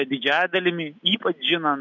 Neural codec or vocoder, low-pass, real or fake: none; 7.2 kHz; real